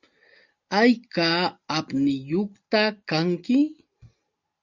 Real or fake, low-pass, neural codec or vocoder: real; 7.2 kHz; none